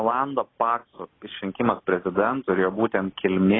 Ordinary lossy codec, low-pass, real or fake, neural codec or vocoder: AAC, 16 kbps; 7.2 kHz; real; none